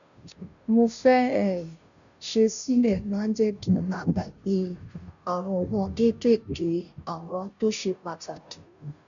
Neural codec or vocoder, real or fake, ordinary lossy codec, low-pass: codec, 16 kHz, 0.5 kbps, FunCodec, trained on Chinese and English, 25 frames a second; fake; none; 7.2 kHz